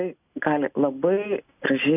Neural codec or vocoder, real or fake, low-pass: none; real; 3.6 kHz